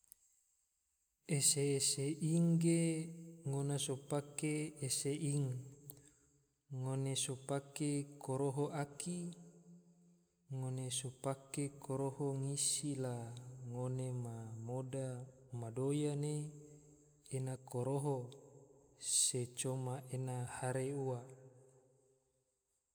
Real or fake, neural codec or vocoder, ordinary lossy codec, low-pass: real; none; none; none